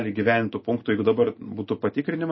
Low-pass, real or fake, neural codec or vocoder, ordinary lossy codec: 7.2 kHz; real; none; MP3, 24 kbps